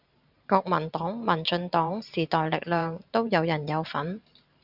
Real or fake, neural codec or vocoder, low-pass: fake; vocoder, 44.1 kHz, 128 mel bands every 512 samples, BigVGAN v2; 5.4 kHz